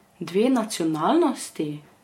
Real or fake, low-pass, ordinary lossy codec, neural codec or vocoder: fake; 19.8 kHz; MP3, 64 kbps; vocoder, 48 kHz, 128 mel bands, Vocos